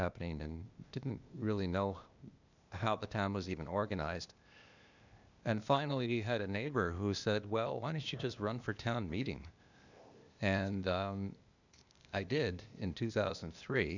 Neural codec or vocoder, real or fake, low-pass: codec, 16 kHz, 0.8 kbps, ZipCodec; fake; 7.2 kHz